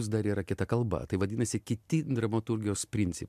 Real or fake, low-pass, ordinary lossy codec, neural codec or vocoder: real; 14.4 kHz; MP3, 96 kbps; none